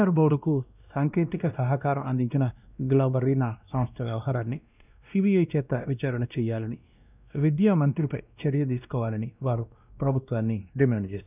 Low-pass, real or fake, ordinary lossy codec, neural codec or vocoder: 3.6 kHz; fake; none; codec, 16 kHz, 1 kbps, X-Codec, WavLM features, trained on Multilingual LibriSpeech